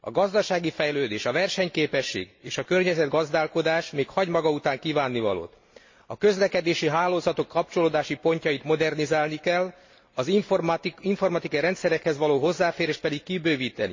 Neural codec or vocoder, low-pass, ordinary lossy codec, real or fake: none; 7.2 kHz; MP3, 32 kbps; real